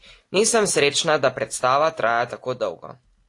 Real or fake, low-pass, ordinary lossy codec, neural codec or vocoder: real; 10.8 kHz; AAC, 48 kbps; none